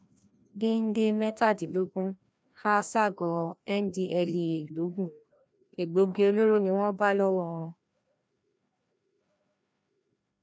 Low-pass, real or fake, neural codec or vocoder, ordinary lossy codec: none; fake; codec, 16 kHz, 1 kbps, FreqCodec, larger model; none